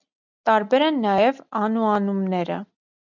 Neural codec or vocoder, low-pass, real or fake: none; 7.2 kHz; real